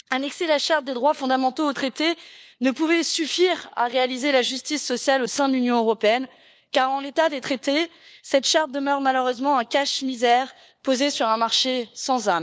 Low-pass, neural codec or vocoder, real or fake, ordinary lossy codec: none; codec, 16 kHz, 4 kbps, FunCodec, trained on LibriTTS, 50 frames a second; fake; none